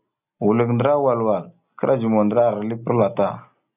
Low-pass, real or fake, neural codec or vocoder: 3.6 kHz; real; none